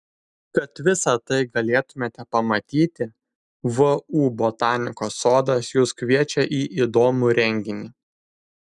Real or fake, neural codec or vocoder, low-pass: real; none; 10.8 kHz